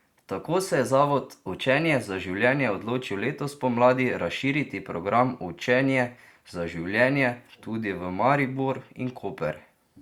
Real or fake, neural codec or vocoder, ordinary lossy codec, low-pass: real; none; Opus, 64 kbps; 19.8 kHz